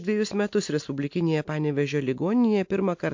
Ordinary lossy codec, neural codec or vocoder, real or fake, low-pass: MP3, 48 kbps; none; real; 7.2 kHz